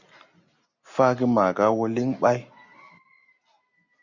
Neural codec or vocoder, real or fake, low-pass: none; real; 7.2 kHz